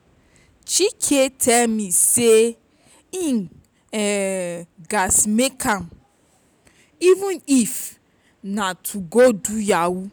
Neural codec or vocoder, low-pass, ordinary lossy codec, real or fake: none; none; none; real